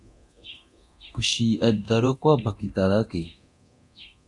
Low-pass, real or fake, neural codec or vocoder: 10.8 kHz; fake; codec, 24 kHz, 0.9 kbps, DualCodec